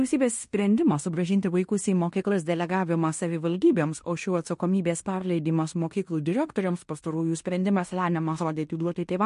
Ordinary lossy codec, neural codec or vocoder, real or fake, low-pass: MP3, 48 kbps; codec, 16 kHz in and 24 kHz out, 0.9 kbps, LongCat-Audio-Codec, fine tuned four codebook decoder; fake; 10.8 kHz